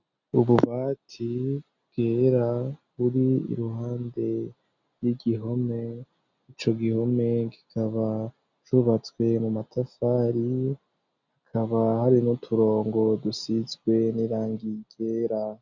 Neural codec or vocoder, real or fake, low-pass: none; real; 7.2 kHz